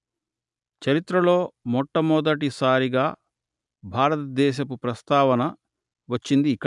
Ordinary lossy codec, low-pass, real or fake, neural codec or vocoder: none; 10.8 kHz; real; none